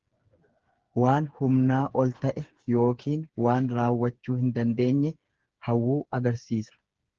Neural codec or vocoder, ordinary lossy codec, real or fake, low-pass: codec, 16 kHz, 8 kbps, FreqCodec, smaller model; Opus, 16 kbps; fake; 7.2 kHz